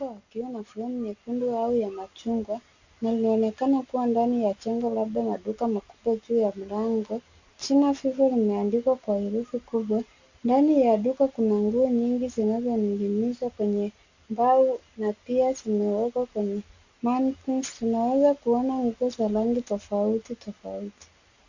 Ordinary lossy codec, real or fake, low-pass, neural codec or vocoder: Opus, 64 kbps; real; 7.2 kHz; none